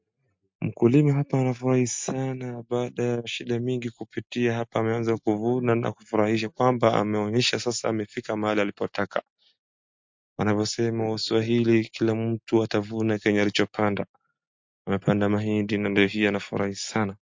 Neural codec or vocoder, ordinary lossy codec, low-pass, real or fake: none; MP3, 48 kbps; 7.2 kHz; real